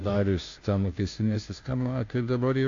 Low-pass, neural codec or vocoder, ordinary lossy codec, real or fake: 7.2 kHz; codec, 16 kHz, 0.5 kbps, FunCodec, trained on Chinese and English, 25 frames a second; AAC, 48 kbps; fake